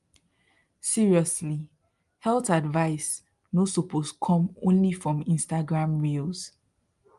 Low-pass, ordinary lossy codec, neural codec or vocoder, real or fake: 10.8 kHz; Opus, 32 kbps; none; real